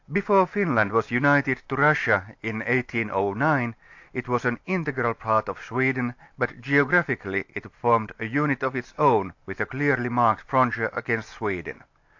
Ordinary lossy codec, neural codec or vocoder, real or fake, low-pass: AAC, 48 kbps; none; real; 7.2 kHz